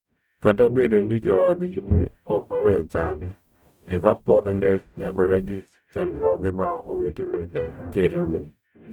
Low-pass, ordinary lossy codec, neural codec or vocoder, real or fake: 19.8 kHz; none; codec, 44.1 kHz, 0.9 kbps, DAC; fake